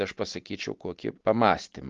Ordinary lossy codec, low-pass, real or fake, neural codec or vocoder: Opus, 32 kbps; 7.2 kHz; real; none